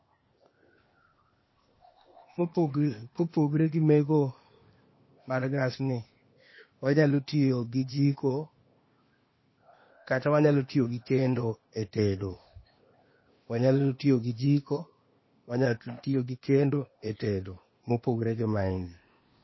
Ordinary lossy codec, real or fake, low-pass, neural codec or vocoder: MP3, 24 kbps; fake; 7.2 kHz; codec, 16 kHz, 0.8 kbps, ZipCodec